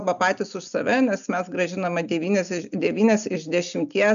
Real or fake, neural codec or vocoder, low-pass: real; none; 7.2 kHz